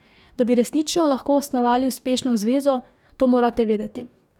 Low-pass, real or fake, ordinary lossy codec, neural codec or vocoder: 19.8 kHz; fake; none; codec, 44.1 kHz, 2.6 kbps, DAC